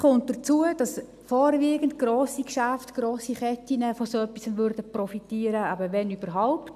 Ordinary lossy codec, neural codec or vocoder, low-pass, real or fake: AAC, 96 kbps; none; 14.4 kHz; real